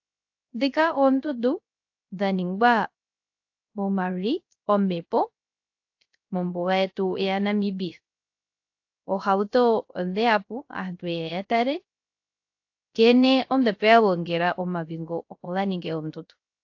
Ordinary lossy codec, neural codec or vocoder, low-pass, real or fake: AAC, 48 kbps; codec, 16 kHz, 0.3 kbps, FocalCodec; 7.2 kHz; fake